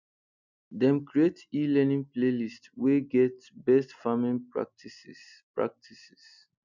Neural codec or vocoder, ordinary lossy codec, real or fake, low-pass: none; none; real; 7.2 kHz